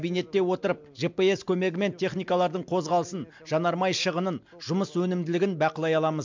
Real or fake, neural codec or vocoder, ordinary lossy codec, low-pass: real; none; MP3, 64 kbps; 7.2 kHz